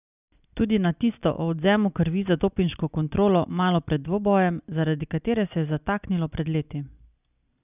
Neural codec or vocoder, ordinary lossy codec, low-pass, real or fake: none; none; 3.6 kHz; real